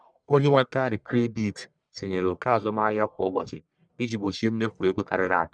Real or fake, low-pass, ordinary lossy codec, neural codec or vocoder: fake; 9.9 kHz; none; codec, 44.1 kHz, 1.7 kbps, Pupu-Codec